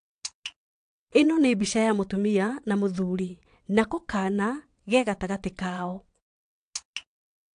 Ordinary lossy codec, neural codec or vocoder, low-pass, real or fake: AAC, 64 kbps; vocoder, 22.05 kHz, 80 mel bands, WaveNeXt; 9.9 kHz; fake